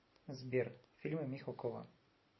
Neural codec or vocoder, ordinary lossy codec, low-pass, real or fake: none; MP3, 24 kbps; 7.2 kHz; real